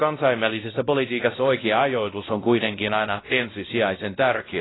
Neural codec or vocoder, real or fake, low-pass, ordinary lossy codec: codec, 16 kHz, 0.5 kbps, X-Codec, WavLM features, trained on Multilingual LibriSpeech; fake; 7.2 kHz; AAC, 16 kbps